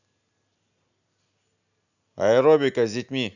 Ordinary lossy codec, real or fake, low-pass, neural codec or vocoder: none; real; 7.2 kHz; none